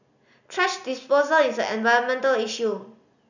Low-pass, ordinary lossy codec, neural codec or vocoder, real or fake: 7.2 kHz; none; none; real